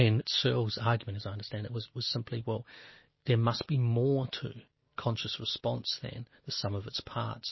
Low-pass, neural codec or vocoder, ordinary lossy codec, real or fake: 7.2 kHz; none; MP3, 24 kbps; real